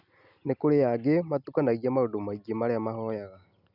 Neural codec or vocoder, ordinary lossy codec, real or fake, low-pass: none; none; real; 5.4 kHz